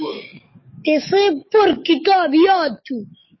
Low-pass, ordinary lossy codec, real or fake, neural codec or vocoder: 7.2 kHz; MP3, 24 kbps; fake; codec, 44.1 kHz, 7.8 kbps, DAC